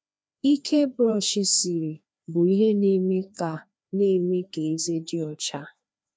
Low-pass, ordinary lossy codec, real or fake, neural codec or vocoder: none; none; fake; codec, 16 kHz, 2 kbps, FreqCodec, larger model